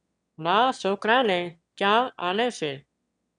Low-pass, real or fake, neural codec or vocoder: 9.9 kHz; fake; autoencoder, 22.05 kHz, a latent of 192 numbers a frame, VITS, trained on one speaker